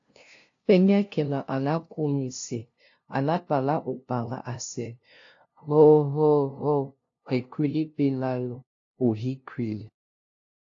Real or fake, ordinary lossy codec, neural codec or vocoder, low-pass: fake; AAC, 48 kbps; codec, 16 kHz, 0.5 kbps, FunCodec, trained on LibriTTS, 25 frames a second; 7.2 kHz